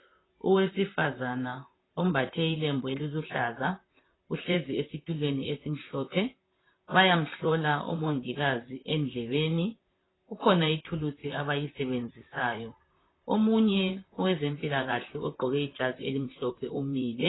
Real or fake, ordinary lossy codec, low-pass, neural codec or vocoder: fake; AAC, 16 kbps; 7.2 kHz; vocoder, 44.1 kHz, 128 mel bands, Pupu-Vocoder